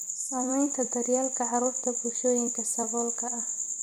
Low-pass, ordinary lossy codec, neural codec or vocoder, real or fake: none; none; vocoder, 44.1 kHz, 128 mel bands every 256 samples, BigVGAN v2; fake